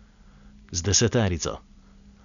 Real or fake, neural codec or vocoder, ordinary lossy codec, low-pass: real; none; none; 7.2 kHz